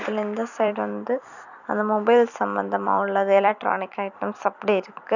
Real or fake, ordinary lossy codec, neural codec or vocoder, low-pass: fake; none; vocoder, 44.1 kHz, 128 mel bands every 256 samples, BigVGAN v2; 7.2 kHz